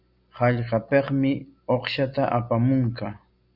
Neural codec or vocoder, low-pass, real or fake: none; 5.4 kHz; real